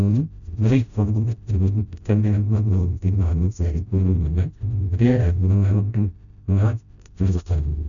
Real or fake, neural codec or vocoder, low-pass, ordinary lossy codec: fake; codec, 16 kHz, 0.5 kbps, FreqCodec, smaller model; 7.2 kHz; none